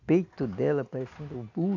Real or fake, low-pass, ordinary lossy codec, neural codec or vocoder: real; 7.2 kHz; none; none